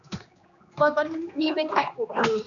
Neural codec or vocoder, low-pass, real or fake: codec, 16 kHz, 2 kbps, X-Codec, HuBERT features, trained on general audio; 7.2 kHz; fake